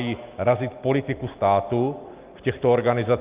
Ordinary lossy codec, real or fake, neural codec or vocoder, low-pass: Opus, 32 kbps; real; none; 3.6 kHz